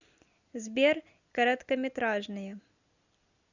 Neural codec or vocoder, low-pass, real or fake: none; 7.2 kHz; real